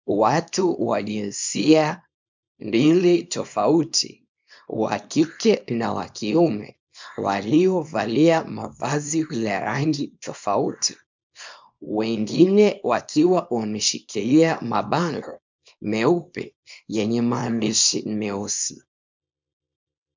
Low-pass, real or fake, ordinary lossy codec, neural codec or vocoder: 7.2 kHz; fake; MP3, 64 kbps; codec, 24 kHz, 0.9 kbps, WavTokenizer, small release